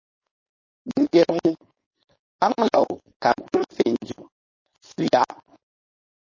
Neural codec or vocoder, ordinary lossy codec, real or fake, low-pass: codec, 16 kHz, 4.8 kbps, FACodec; MP3, 32 kbps; fake; 7.2 kHz